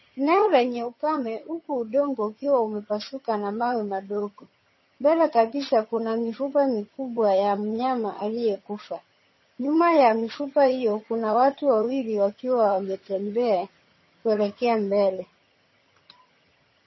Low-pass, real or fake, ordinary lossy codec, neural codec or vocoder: 7.2 kHz; fake; MP3, 24 kbps; vocoder, 22.05 kHz, 80 mel bands, HiFi-GAN